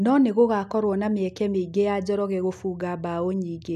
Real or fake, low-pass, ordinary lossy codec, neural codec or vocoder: real; 14.4 kHz; none; none